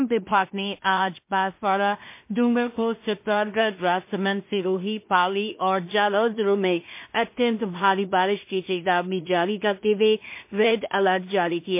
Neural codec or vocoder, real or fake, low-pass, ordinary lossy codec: codec, 16 kHz in and 24 kHz out, 0.4 kbps, LongCat-Audio-Codec, two codebook decoder; fake; 3.6 kHz; MP3, 24 kbps